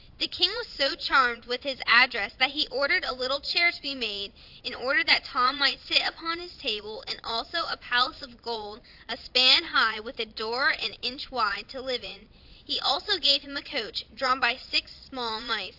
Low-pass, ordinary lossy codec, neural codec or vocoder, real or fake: 5.4 kHz; AAC, 48 kbps; vocoder, 22.05 kHz, 80 mel bands, Vocos; fake